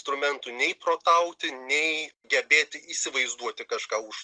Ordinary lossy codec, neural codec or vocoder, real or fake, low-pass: Opus, 24 kbps; none; real; 9.9 kHz